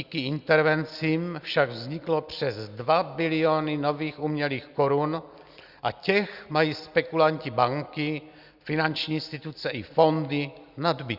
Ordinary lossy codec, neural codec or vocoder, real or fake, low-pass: Opus, 64 kbps; none; real; 5.4 kHz